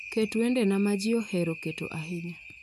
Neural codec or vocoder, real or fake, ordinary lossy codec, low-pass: none; real; none; 14.4 kHz